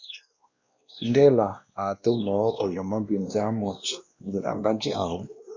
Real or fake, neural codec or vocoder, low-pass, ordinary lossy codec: fake; codec, 16 kHz, 1 kbps, X-Codec, WavLM features, trained on Multilingual LibriSpeech; 7.2 kHz; Opus, 64 kbps